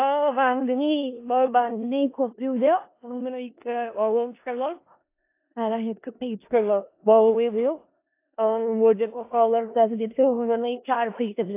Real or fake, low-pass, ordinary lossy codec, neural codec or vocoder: fake; 3.6 kHz; AAC, 24 kbps; codec, 16 kHz in and 24 kHz out, 0.4 kbps, LongCat-Audio-Codec, four codebook decoder